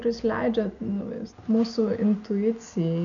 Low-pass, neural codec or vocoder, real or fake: 7.2 kHz; none; real